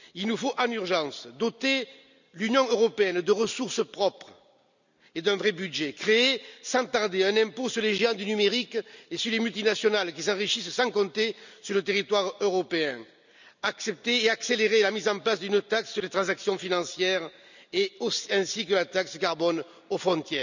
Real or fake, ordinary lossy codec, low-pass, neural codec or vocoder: real; none; 7.2 kHz; none